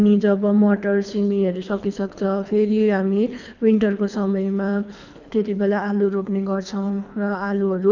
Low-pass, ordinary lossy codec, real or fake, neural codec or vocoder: 7.2 kHz; none; fake; codec, 24 kHz, 3 kbps, HILCodec